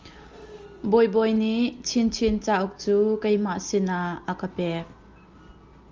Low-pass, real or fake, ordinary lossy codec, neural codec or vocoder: 7.2 kHz; real; Opus, 32 kbps; none